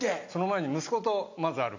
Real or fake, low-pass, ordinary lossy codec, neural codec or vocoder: real; 7.2 kHz; AAC, 48 kbps; none